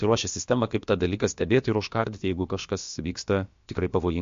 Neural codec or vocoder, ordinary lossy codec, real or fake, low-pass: codec, 16 kHz, about 1 kbps, DyCAST, with the encoder's durations; MP3, 48 kbps; fake; 7.2 kHz